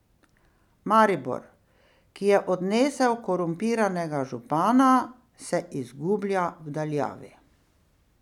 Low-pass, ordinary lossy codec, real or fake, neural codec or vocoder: 19.8 kHz; none; real; none